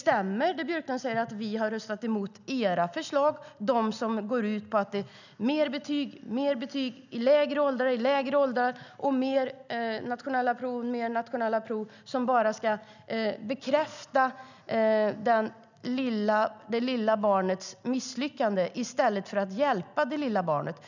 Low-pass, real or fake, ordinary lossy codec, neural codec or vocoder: 7.2 kHz; real; none; none